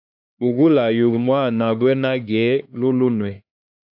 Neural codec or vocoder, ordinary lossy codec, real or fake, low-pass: codec, 16 kHz, 2 kbps, X-Codec, HuBERT features, trained on LibriSpeech; AAC, 48 kbps; fake; 5.4 kHz